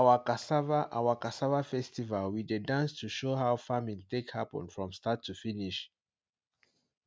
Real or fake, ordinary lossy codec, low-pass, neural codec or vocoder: real; none; none; none